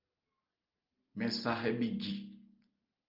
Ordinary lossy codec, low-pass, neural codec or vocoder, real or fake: Opus, 32 kbps; 5.4 kHz; none; real